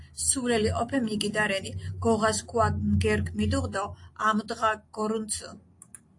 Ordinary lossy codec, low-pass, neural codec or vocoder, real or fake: AAC, 48 kbps; 10.8 kHz; none; real